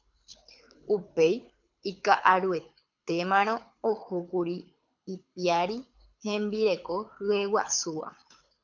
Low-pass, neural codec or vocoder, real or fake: 7.2 kHz; codec, 24 kHz, 6 kbps, HILCodec; fake